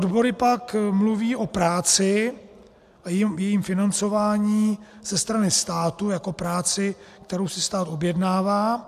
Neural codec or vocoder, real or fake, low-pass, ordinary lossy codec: none; real; 14.4 kHz; AAC, 96 kbps